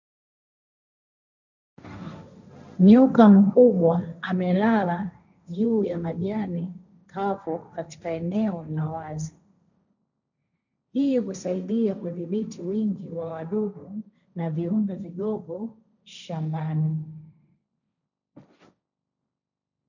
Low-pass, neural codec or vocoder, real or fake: 7.2 kHz; codec, 16 kHz, 1.1 kbps, Voila-Tokenizer; fake